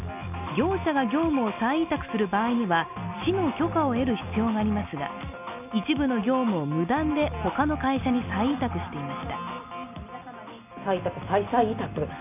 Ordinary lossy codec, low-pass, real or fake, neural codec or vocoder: none; 3.6 kHz; real; none